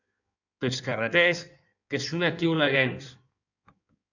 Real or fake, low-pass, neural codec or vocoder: fake; 7.2 kHz; codec, 16 kHz in and 24 kHz out, 1.1 kbps, FireRedTTS-2 codec